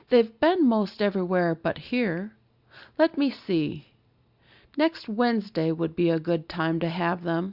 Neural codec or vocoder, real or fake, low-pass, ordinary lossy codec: none; real; 5.4 kHz; Opus, 64 kbps